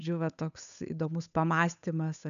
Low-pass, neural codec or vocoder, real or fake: 7.2 kHz; codec, 16 kHz, 8 kbps, FunCodec, trained on Chinese and English, 25 frames a second; fake